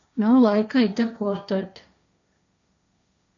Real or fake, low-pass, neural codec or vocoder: fake; 7.2 kHz; codec, 16 kHz, 1.1 kbps, Voila-Tokenizer